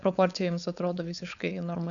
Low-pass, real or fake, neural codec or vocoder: 7.2 kHz; fake; codec, 16 kHz, 4.8 kbps, FACodec